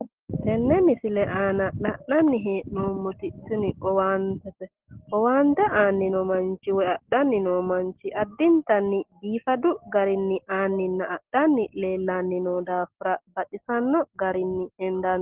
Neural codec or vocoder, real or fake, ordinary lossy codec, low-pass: none; real; Opus, 16 kbps; 3.6 kHz